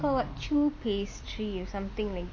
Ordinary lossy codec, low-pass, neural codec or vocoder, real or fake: none; none; none; real